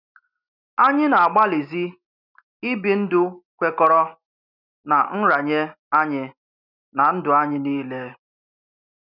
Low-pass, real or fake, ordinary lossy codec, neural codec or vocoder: 5.4 kHz; real; none; none